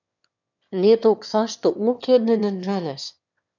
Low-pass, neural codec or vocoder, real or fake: 7.2 kHz; autoencoder, 22.05 kHz, a latent of 192 numbers a frame, VITS, trained on one speaker; fake